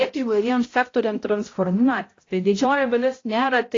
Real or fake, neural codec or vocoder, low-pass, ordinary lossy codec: fake; codec, 16 kHz, 0.5 kbps, X-Codec, HuBERT features, trained on balanced general audio; 7.2 kHz; AAC, 32 kbps